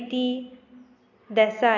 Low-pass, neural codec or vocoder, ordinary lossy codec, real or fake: 7.2 kHz; none; none; real